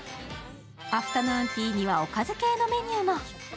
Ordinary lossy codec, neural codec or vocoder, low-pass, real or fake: none; none; none; real